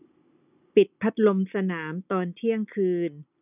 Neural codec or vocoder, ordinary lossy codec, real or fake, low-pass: none; none; real; 3.6 kHz